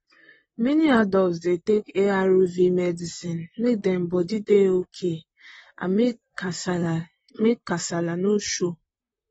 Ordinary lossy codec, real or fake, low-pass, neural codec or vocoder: AAC, 24 kbps; fake; 19.8 kHz; vocoder, 44.1 kHz, 128 mel bands, Pupu-Vocoder